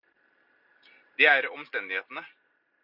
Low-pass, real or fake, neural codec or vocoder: 5.4 kHz; real; none